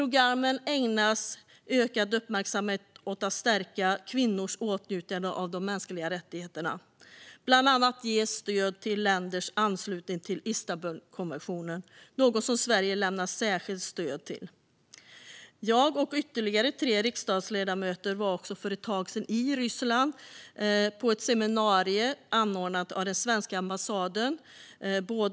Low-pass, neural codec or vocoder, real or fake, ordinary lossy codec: none; none; real; none